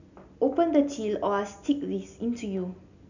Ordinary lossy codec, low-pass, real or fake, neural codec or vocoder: none; 7.2 kHz; real; none